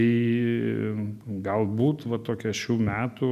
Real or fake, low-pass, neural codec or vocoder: real; 14.4 kHz; none